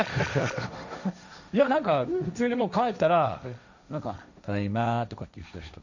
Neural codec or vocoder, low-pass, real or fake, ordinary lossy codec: codec, 16 kHz, 1.1 kbps, Voila-Tokenizer; 7.2 kHz; fake; none